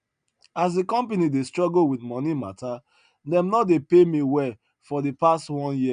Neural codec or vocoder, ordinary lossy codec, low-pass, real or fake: none; none; 9.9 kHz; real